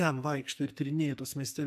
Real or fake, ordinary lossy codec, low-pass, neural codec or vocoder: fake; MP3, 96 kbps; 14.4 kHz; codec, 44.1 kHz, 2.6 kbps, SNAC